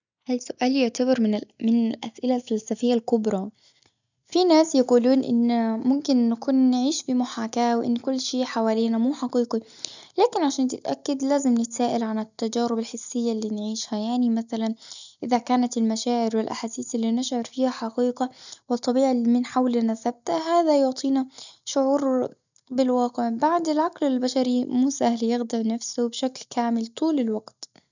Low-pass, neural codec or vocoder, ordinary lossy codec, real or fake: 7.2 kHz; none; none; real